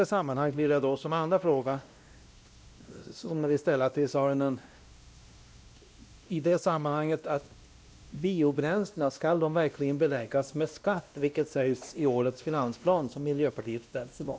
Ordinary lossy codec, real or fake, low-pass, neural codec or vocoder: none; fake; none; codec, 16 kHz, 1 kbps, X-Codec, WavLM features, trained on Multilingual LibriSpeech